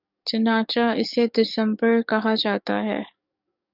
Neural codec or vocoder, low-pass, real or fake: codec, 44.1 kHz, 7.8 kbps, DAC; 5.4 kHz; fake